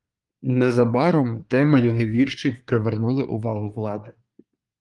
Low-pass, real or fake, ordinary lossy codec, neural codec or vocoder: 10.8 kHz; fake; Opus, 32 kbps; codec, 24 kHz, 1 kbps, SNAC